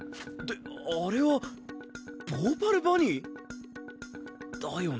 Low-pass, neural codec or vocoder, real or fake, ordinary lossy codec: none; none; real; none